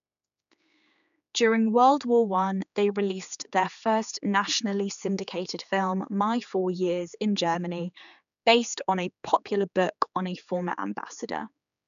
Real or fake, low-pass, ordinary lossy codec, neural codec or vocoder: fake; 7.2 kHz; none; codec, 16 kHz, 4 kbps, X-Codec, HuBERT features, trained on general audio